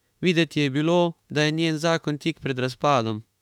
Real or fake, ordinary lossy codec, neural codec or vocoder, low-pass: fake; none; autoencoder, 48 kHz, 32 numbers a frame, DAC-VAE, trained on Japanese speech; 19.8 kHz